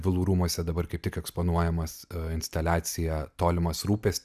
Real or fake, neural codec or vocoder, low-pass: real; none; 14.4 kHz